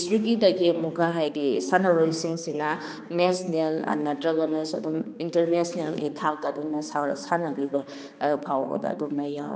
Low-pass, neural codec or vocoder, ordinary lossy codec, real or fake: none; codec, 16 kHz, 2 kbps, X-Codec, HuBERT features, trained on balanced general audio; none; fake